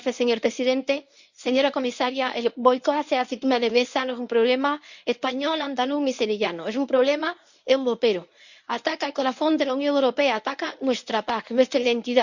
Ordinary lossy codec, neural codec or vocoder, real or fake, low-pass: none; codec, 24 kHz, 0.9 kbps, WavTokenizer, medium speech release version 1; fake; 7.2 kHz